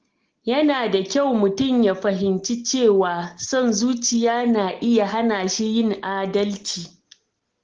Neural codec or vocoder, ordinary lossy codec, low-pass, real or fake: none; Opus, 16 kbps; 7.2 kHz; real